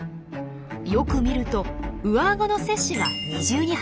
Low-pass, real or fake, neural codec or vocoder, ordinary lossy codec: none; real; none; none